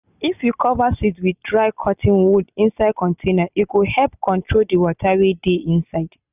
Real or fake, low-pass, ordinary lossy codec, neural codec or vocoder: real; 3.6 kHz; none; none